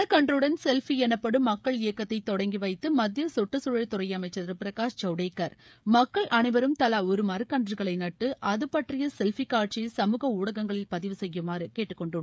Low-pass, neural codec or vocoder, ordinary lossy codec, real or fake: none; codec, 16 kHz, 16 kbps, FreqCodec, smaller model; none; fake